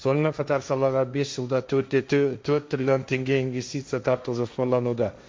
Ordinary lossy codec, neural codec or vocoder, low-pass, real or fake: none; codec, 16 kHz, 1.1 kbps, Voila-Tokenizer; none; fake